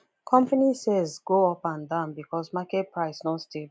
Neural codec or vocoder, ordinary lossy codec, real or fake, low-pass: none; none; real; none